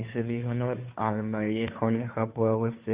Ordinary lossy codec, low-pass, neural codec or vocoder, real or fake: Opus, 64 kbps; 3.6 kHz; codec, 16 kHz, 2 kbps, FunCodec, trained on Chinese and English, 25 frames a second; fake